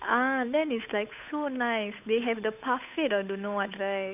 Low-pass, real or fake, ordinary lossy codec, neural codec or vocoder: 3.6 kHz; fake; none; codec, 16 kHz, 8 kbps, FunCodec, trained on Chinese and English, 25 frames a second